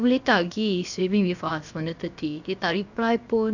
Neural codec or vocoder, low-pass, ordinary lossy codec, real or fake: codec, 16 kHz, about 1 kbps, DyCAST, with the encoder's durations; 7.2 kHz; none; fake